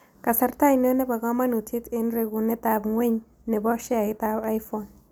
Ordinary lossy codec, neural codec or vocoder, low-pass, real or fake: none; none; none; real